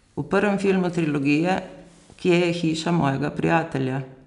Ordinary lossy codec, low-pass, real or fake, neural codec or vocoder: none; 10.8 kHz; real; none